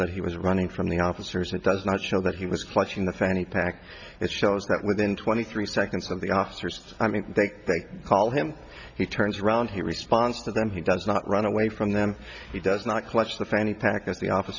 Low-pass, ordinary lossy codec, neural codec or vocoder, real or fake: 7.2 kHz; Opus, 64 kbps; none; real